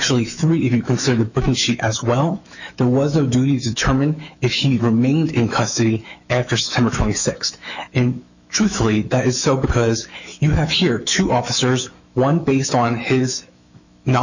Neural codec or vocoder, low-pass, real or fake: autoencoder, 48 kHz, 128 numbers a frame, DAC-VAE, trained on Japanese speech; 7.2 kHz; fake